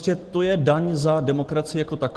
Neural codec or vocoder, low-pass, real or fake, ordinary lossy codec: none; 10.8 kHz; real; Opus, 16 kbps